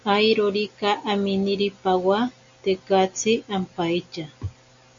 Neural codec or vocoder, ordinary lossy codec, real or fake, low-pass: none; AAC, 64 kbps; real; 7.2 kHz